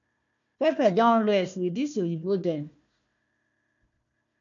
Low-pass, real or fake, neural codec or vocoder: 7.2 kHz; fake; codec, 16 kHz, 1 kbps, FunCodec, trained on Chinese and English, 50 frames a second